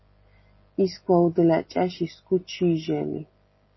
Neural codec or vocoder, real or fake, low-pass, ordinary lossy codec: none; real; 7.2 kHz; MP3, 24 kbps